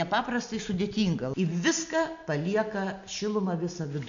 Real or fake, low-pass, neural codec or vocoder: real; 7.2 kHz; none